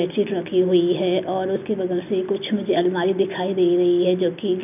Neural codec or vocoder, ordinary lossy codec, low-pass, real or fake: none; none; 3.6 kHz; real